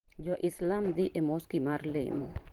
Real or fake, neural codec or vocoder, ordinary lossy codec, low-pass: fake; vocoder, 44.1 kHz, 128 mel bands every 512 samples, BigVGAN v2; Opus, 16 kbps; 19.8 kHz